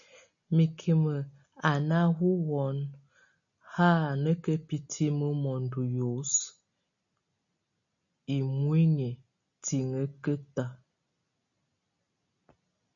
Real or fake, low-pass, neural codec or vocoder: real; 7.2 kHz; none